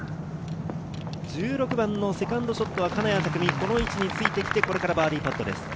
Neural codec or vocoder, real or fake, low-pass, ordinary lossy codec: none; real; none; none